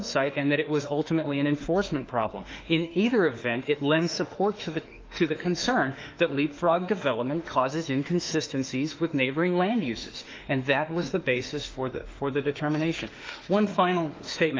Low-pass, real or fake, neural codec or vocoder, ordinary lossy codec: 7.2 kHz; fake; autoencoder, 48 kHz, 32 numbers a frame, DAC-VAE, trained on Japanese speech; Opus, 24 kbps